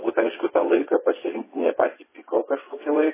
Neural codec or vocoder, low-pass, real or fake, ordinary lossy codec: vocoder, 22.05 kHz, 80 mel bands, WaveNeXt; 3.6 kHz; fake; MP3, 16 kbps